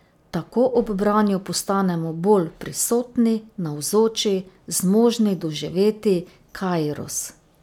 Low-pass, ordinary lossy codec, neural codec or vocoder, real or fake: 19.8 kHz; none; none; real